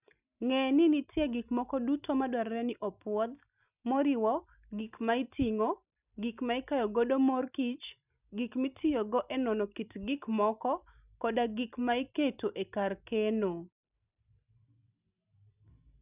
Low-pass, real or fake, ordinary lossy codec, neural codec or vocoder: 3.6 kHz; real; none; none